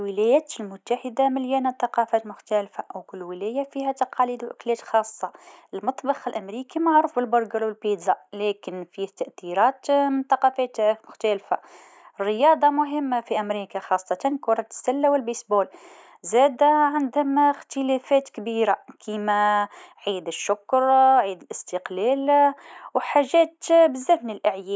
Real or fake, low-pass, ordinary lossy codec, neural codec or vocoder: real; none; none; none